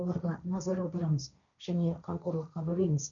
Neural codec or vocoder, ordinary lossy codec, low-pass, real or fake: codec, 16 kHz, 1.1 kbps, Voila-Tokenizer; none; 7.2 kHz; fake